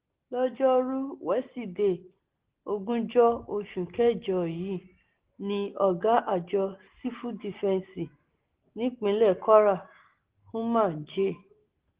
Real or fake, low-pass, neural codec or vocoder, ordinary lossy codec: real; 3.6 kHz; none; Opus, 16 kbps